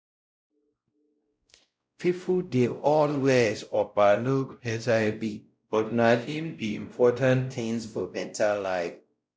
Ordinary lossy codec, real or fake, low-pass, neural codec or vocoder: none; fake; none; codec, 16 kHz, 0.5 kbps, X-Codec, WavLM features, trained on Multilingual LibriSpeech